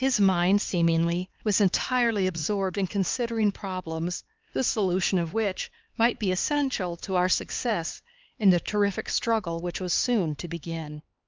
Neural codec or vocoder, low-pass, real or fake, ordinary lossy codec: codec, 16 kHz, 4 kbps, X-Codec, HuBERT features, trained on LibriSpeech; 7.2 kHz; fake; Opus, 32 kbps